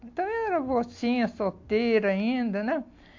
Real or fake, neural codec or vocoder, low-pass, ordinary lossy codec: real; none; 7.2 kHz; AAC, 48 kbps